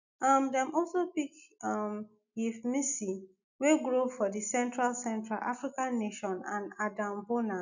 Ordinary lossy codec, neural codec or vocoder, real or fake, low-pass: none; none; real; 7.2 kHz